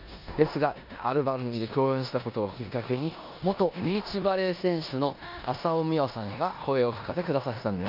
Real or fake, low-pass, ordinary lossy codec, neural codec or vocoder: fake; 5.4 kHz; none; codec, 16 kHz in and 24 kHz out, 0.9 kbps, LongCat-Audio-Codec, four codebook decoder